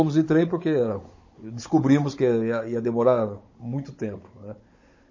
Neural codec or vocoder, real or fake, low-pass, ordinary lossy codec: codec, 16 kHz, 16 kbps, FunCodec, trained on Chinese and English, 50 frames a second; fake; 7.2 kHz; MP3, 32 kbps